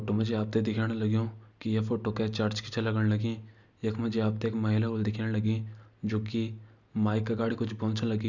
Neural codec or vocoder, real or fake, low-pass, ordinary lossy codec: none; real; 7.2 kHz; none